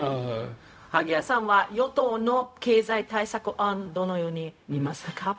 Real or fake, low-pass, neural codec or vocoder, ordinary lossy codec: fake; none; codec, 16 kHz, 0.4 kbps, LongCat-Audio-Codec; none